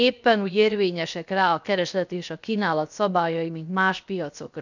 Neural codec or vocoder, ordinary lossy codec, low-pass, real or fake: codec, 16 kHz, 0.7 kbps, FocalCodec; none; 7.2 kHz; fake